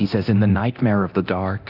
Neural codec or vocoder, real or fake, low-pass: codec, 24 kHz, 0.9 kbps, DualCodec; fake; 5.4 kHz